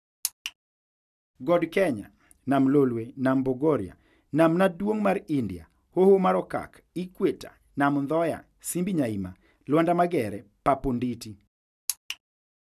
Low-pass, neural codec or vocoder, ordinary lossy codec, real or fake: 14.4 kHz; none; none; real